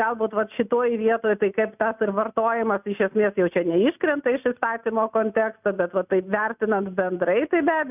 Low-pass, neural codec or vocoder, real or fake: 3.6 kHz; none; real